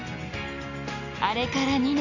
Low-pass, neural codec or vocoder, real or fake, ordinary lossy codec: 7.2 kHz; none; real; none